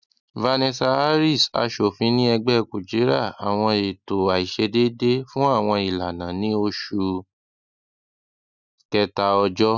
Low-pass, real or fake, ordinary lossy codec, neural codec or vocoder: 7.2 kHz; real; none; none